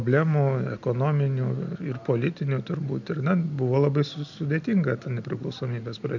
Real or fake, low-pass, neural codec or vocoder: real; 7.2 kHz; none